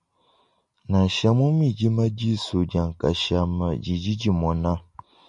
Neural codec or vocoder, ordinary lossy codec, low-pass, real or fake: none; MP3, 64 kbps; 10.8 kHz; real